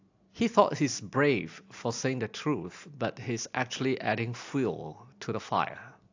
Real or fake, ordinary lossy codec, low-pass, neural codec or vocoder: real; AAC, 48 kbps; 7.2 kHz; none